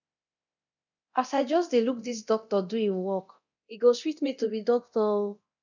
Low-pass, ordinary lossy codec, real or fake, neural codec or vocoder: 7.2 kHz; none; fake; codec, 24 kHz, 0.9 kbps, DualCodec